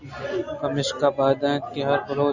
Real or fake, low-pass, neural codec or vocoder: real; 7.2 kHz; none